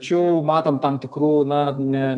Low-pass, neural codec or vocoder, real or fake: 10.8 kHz; codec, 32 kHz, 1.9 kbps, SNAC; fake